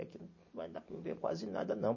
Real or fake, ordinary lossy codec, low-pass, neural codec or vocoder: real; MP3, 32 kbps; 7.2 kHz; none